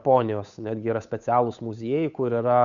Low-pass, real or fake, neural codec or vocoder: 7.2 kHz; fake; codec, 16 kHz, 8 kbps, FunCodec, trained on Chinese and English, 25 frames a second